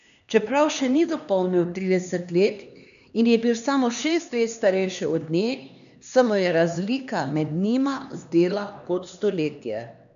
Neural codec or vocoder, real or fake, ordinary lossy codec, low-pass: codec, 16 kHz, 2 kbps, X-Codec, HuBERT features, trained on LibriSpeech; fake; none; 7.2 kHz